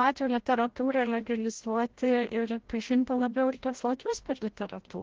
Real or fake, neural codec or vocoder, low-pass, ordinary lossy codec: fake; codec, 16 kHz, 0.5 kbps, FreqCodec, larger model; 7.2 kHz; Opus, 16 kbps